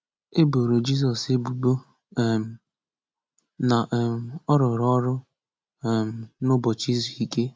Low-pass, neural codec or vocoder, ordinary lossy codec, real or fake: none; none; none; real